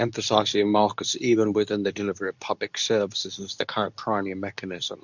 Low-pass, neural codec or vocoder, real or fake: 7.2 kHz; codec, 24 kHz, 0.9 kbps, WavTokenizer, medium speech release version 2; fake